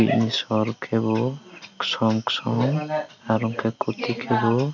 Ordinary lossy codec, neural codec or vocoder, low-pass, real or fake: none; none; 7.2 kHz; real